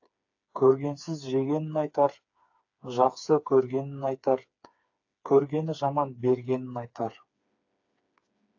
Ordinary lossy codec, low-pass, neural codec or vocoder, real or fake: AAC, 48 kbps; 7.2 kHz; codec, 16 kHz, 4 kbps, FreqCodec, smaller model; fake